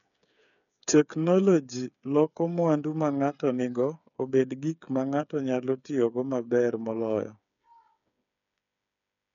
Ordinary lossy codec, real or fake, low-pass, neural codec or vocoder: none; fake; 7.2 kHz; codec, 16 kHz, 8 kbps, FreqCodec, smaller model